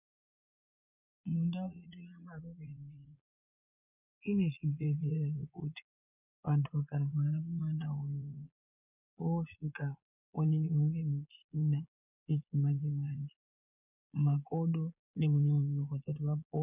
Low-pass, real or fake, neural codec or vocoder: 3.6 kHz; fake; vocoder, 22.05 kHz, 80 mel bands, Vocos